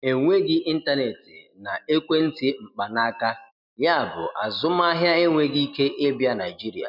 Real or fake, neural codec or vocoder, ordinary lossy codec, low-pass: real; none; none; 5.4 kHz